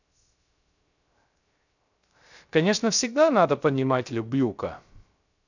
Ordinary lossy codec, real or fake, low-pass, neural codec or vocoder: none; fake; 7.2 kHz; codec, 16 kHz, 0.3 kbps, FocalCodec